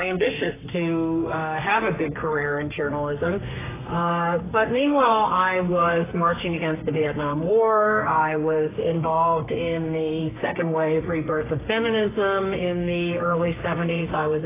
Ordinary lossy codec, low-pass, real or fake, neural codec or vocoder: AAC, 16 kbps; 3.6 kHz; fake; codec, 32 kHz, 1.9 kbps, SNAC